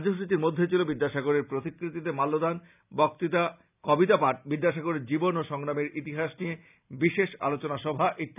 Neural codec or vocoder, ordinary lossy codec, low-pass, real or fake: none; none; 3.6 kHz; real